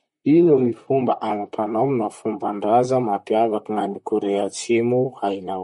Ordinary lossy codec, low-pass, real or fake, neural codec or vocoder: MP3, 48 kbps; 19.8 kHz; fake; vocoder, 44.1 kHz, 128 mel bands, Pupu-Vocoder